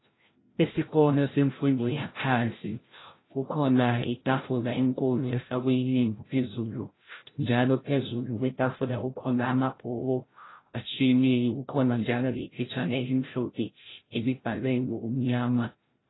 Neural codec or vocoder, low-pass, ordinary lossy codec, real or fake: codec, 16 kHz, 0.5 kbps, FreqCodec, larger model; 7.2 kHz; AAC, 16 kbps; fake